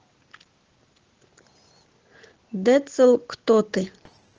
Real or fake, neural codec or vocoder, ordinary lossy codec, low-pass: real; none; Opus, 16 kbps; 7.2 kHz